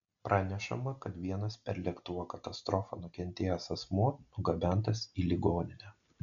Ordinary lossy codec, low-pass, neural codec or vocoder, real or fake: MP3, 96 kbps; 7.2 kHz; none; real